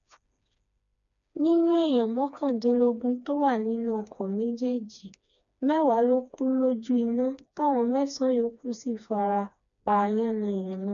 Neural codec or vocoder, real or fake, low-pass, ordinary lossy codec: codec, 16 kHz, 2 kbps, FreqCodec, smaller model; fake; 7.2 kHz; MP3, 96 kbps